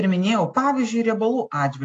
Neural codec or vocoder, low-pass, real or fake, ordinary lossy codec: none; 9.9 kHz; real; AAC, 48 kbps